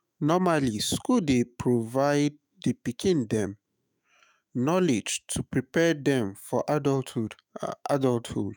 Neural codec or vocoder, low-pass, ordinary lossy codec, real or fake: autoencoder, 48 kHz, 128 numbers a frame, DAC-VAE, trained on Japanese speech; none; none; fake